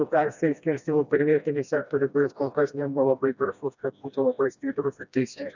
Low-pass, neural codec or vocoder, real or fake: 7.2 kHz; codec, 16 kHz, 1 kbps, FreqCodec, smaller model; fake